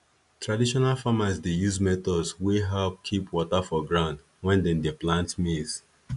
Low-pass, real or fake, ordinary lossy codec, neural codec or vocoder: 10.8 kHz; real; AAC, 96 kbps; none